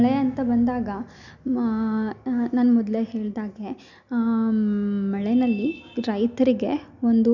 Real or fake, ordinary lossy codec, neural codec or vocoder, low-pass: real; none; none; 7.2 kHz